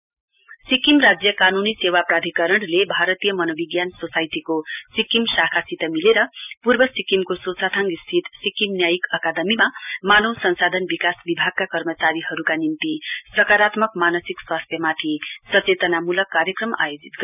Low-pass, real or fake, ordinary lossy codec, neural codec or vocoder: 3.6 kHz; real; none; none